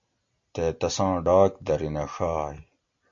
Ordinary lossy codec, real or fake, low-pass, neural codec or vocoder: AAC, 64 kbps; real; 7.2 kHz; none